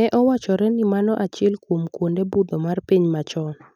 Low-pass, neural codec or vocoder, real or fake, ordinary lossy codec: 19.8 kHz; none; real; none